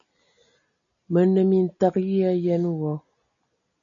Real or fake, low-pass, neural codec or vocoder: real; 7.2 kHz; none